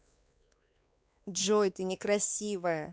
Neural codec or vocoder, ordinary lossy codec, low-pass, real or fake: codec, 16 kHz, 2 kbps, X-Codec, WavLM features, trained on Multilingual LibriSpeech; none; none; fake